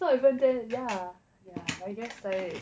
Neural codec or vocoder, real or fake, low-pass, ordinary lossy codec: none; real; none; none